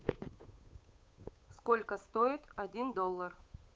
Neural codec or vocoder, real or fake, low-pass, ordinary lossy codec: codec, 16 kHz, 8 kbps, FunCodec, trained on Chinese and English, 25 frames a second; fake; none; none